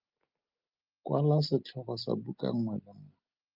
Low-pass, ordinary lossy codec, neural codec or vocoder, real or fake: 5.4 kHz; Opus, 24 kbps; none; real